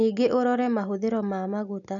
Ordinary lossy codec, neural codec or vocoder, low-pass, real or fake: none; none; 7.2 kHz; real